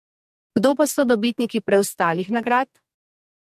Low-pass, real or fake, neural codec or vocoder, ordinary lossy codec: 14.4 kHz; fake; codec, 44.1 kHz, 2.6 kbps, SNAC; MP3, 64 kbps